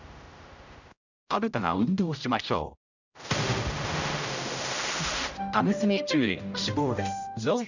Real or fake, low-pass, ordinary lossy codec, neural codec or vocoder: fake; 7.2 kHz; none; codec, 16 kHz, 0.5 kbps, X-Codec, HuBERT features, trained on general audio